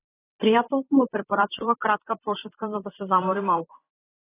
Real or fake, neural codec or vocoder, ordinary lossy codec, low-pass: fake; vocoder, 44.1 kHz, 128 mel bands, Pupu-Vocoder; AAC, 16 kbps; 3.6 kHz